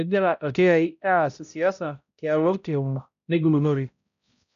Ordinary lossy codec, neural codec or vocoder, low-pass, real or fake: none; codec, 16 kHz, 0.5 kbps, X-Codec, HuBERT features, trained on balanced general audio; 7.2 kHz; fake